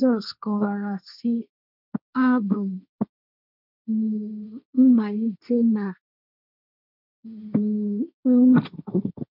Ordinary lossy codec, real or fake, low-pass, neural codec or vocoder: none; fake; 5.4 kHz; codec, 16 kHz, 1.1 kbps, Voila-Tokenizer